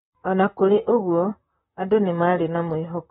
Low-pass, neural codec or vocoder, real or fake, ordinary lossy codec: 19.8 kHz; vocoder, 44.1 kHz, 128 mel bands, Pupu-Vocoder; fake; AAC, 16 kbps